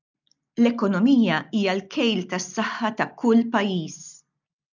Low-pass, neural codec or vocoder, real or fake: 7.2 kHz; none; real